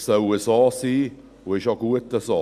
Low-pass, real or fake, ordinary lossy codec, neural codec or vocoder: 14.4 kHz; real; none; none